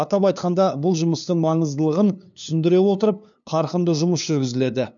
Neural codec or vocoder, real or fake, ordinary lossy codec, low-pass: codec, 16 kHz, 2 kbps, FunCodec, trained on LibriTTS, 25 frames a second; fake; none; 7.2 kHz